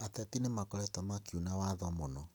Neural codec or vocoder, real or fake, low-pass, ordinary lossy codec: none; real; none; none